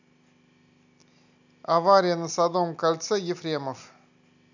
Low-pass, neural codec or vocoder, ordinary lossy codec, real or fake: 7.2 kHz; none; none; real